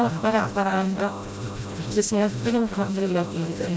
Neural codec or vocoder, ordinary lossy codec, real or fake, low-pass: codec, 16 kHz, 0.5 kbps, FreqCodec, smaller model; none; fake; none